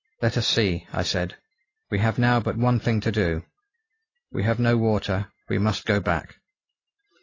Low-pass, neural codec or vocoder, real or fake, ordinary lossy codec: 7.2 kHz; none; real; AAC, 32 kbps